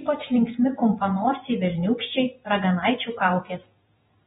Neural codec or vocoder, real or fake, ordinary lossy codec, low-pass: vocoder, 48 kHz, 128 mel bands, Vocos; fake; AAC, 16 kbps; 19.8 kHz